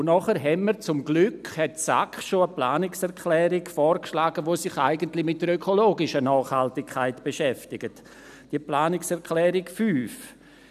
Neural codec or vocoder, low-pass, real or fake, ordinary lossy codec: none; 14.4 kHz; real; none